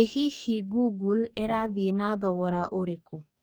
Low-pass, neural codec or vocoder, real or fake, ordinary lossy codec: none; codec, 44.1 kHz, 2.6 kbps, DAC; fake; none